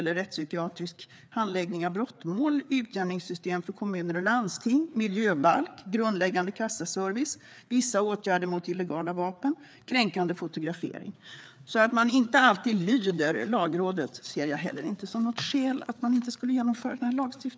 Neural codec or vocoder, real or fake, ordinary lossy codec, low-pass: codec, 16 kHz, 4 kbps, FreqCodec, larger model; fake; none; none